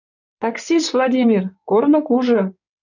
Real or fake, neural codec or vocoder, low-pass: fake; codec, 16 kHz in and 24 kHz out, 2.2 kbps, FireRedTTS-2 codec; 7.2 kHz